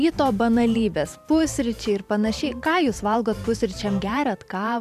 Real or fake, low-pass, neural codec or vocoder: fake; 14.4 kHz; vocoder, 44.1 kHz, 128 mel bands every 512 samples, BigVGAN v2